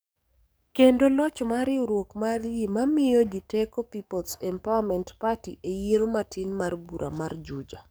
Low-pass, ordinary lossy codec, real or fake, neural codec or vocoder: none; none; fake; codec, 44.1 kHz, 7.8 kbps, DAC